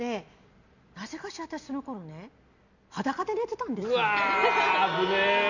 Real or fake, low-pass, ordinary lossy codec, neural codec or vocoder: real; 7.2 kHz; none; none